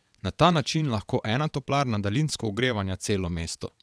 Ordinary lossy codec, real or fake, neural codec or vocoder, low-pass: none; fake; vocoder, 22.05 kHz, 80 mel bands, WaveNeXt; none